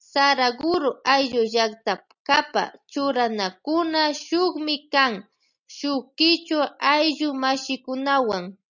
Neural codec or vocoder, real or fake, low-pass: none; real; 7.2 kHz